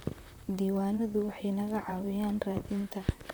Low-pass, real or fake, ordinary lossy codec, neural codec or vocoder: none; fake; none; vocoder, 44.1 kHz, 128 mel bands, Pupu-Vocoder